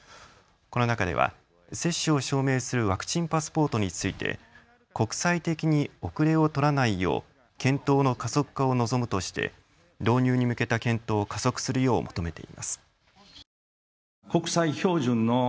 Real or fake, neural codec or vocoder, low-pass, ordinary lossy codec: real; none; none; none